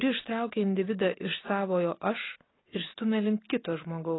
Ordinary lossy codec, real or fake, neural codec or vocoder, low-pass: AAC, 16 kbps; real; none; 7.2 kHz